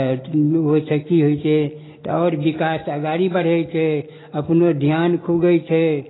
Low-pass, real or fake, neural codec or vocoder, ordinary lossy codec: 7.2 kHz; real; none; AAC, 16 kbps